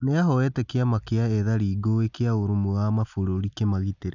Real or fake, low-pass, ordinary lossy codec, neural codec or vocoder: real; 7.2 kHz; none; none